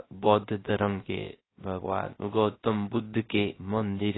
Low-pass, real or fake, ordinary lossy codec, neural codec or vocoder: 7.2 kHz; fake; AAC, 16 kbps; codec, 16 kHz, 0.3 kbps, FocalCodec